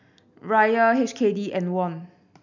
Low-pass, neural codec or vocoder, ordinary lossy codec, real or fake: 7.2 kHz; none; none; real